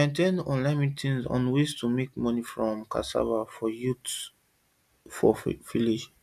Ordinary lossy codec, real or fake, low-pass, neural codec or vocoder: none; real; 14.4 kHz; none